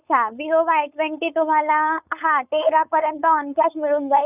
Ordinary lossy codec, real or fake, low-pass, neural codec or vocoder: none; fake; 3.6 kHz; codec, 16 kHz, 4.8 kbps, FACodec